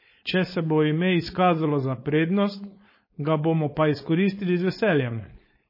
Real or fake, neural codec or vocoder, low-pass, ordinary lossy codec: fake; codec, 16 kHz, 4.8 kbps, FACodec; 5.4 kHz; MP3, 24 kbps